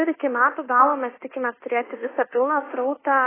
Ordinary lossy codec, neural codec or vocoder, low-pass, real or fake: AAC, 16 kbps; codec, 24 kHz, 1.2 kbps, DualCodec; 3.6 kHz; fake